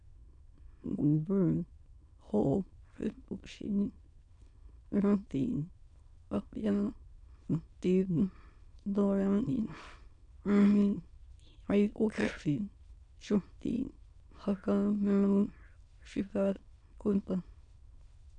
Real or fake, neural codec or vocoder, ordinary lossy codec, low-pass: fake; autoencoder, 22.05 kHz, a latent of 192 numbers a frame, VITS, trained on many speakers; none; 9.9 kHz